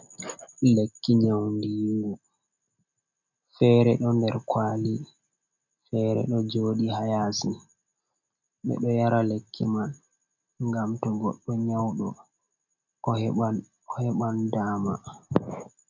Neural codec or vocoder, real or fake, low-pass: none; real; 7.2 kHz